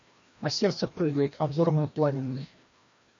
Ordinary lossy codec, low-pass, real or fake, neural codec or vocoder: MP3, 64 kbps; 7.2 kHz; fake; codec, 16 kHz, 1 kbps, FreqCodec, larger model